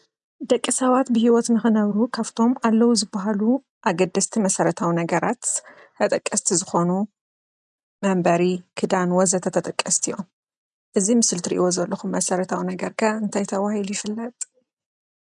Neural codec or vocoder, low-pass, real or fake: none; 10.8 kHz; real